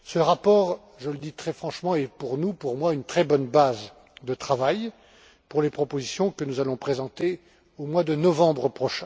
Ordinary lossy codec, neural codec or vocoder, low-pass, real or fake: none; none; none; real